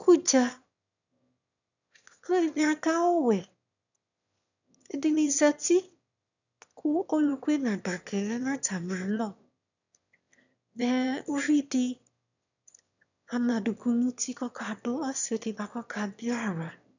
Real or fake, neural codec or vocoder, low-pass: fake; autoencoder, 22.05 kHz, a latent of 192 numbers a frame, VITS, trained on one speaker; 7.2 kHz